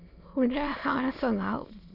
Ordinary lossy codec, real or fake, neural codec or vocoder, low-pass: Opus, 64 kbps; fake; autoencoder, 22.05 kHz, a latent of 192 numbers a frame, VITS, trained on many speakers; 5.4 kHz